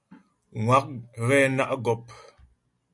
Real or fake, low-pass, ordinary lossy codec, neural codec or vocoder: real; 10.8 kHz; MP3, 64 kbps; none